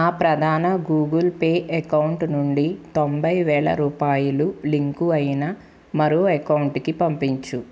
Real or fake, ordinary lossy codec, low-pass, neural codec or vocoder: real; none; none; none